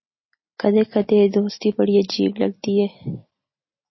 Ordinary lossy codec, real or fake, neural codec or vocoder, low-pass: MP3, 24 kbps; real; none; 7.2 kHz